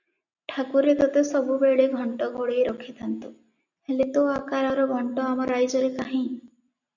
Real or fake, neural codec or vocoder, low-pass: real; none; 7.2 kHz